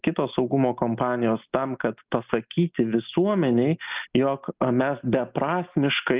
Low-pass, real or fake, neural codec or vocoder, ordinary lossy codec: 3.6 kHz; real; none; Opus, 24 kbps